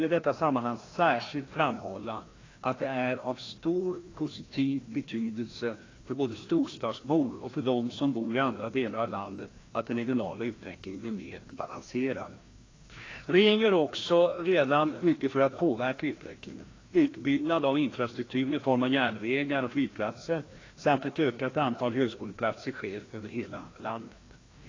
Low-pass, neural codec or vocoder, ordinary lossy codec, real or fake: 7.2 kHz; codec, 16 kHz, 1 kbps, FreqCodec, larger model; AAC, 32 kbps; fake